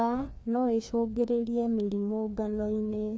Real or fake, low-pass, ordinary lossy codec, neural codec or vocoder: fake; none; none; codec, 16 kHz, 2 kbps, FreqCodec, larger model